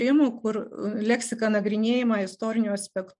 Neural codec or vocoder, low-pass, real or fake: none; 10.8 kHz; real